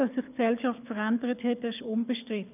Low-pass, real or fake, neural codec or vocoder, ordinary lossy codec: 3.6 kHz; fake; codec, 24 kHz, 6 kbps, HILCodec; none